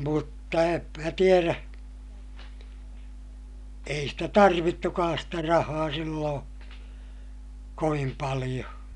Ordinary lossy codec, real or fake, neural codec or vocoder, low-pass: none; real; none; 10.8 kHz